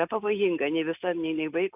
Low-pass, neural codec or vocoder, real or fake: 3.6 kHz; none; real